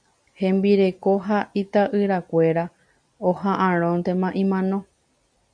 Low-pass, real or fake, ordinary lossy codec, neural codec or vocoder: 9.9 kHz; real; MP3, 96 kbps; none